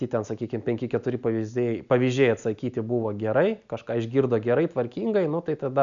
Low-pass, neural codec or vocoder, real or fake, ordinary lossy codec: 7.2 kHz; none; real; MP3, 96 kbps